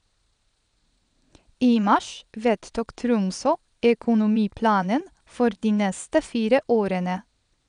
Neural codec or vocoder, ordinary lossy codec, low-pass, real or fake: vocoder, 22.05 kHz, 80 mel bands, Vocos; none; 9.9 kHz; fake